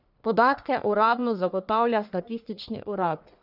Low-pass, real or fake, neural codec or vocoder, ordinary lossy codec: 5.4 kHz; fake; codec, 44.1 kHz, 1.7 kbps, Pupu-Codec; none